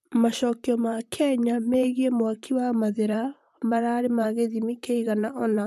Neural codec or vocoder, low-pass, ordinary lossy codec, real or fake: vocoder, 44.1 kHz, 128 mel bands, Pupu-Vocoder; 14.4 kHz; MP3, 96 kbps; fake